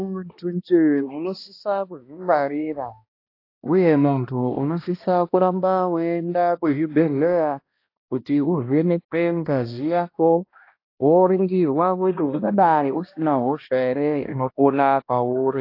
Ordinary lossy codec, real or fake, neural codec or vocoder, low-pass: AAC, 32 kbps; fake; codec, 16 kHz, 1 kbps, X-Codec, HuBERT features, trained on balanced general audio; 5.4 kHz